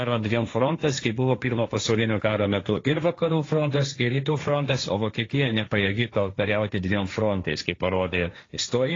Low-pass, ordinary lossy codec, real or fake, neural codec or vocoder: 7.2 kHz; AAC, 32 kbps; fake; codec, 16 kHz, 1.1 kbps, Voila-Tokenizer